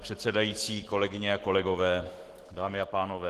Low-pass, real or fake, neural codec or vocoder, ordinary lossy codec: 14.4 kHz; fake; autoencoder, 48 kHz, 128 numbers a frame, DAC-VAE, trained on Japanese speech; Opus, 16 kbps